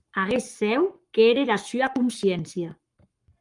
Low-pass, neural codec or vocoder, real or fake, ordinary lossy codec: 10.8 kHz; codec, 44.1 kHz, 7.8 kbps, Pupu-Codec; fake; Opus, 32 kbps